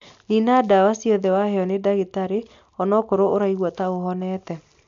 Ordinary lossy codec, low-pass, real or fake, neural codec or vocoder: AAC, 64 kbps; 7.2 kHz; real; none